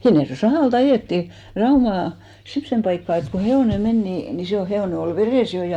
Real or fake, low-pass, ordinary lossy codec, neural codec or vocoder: real; 19.8 kHz; MP3, 96 kbps; none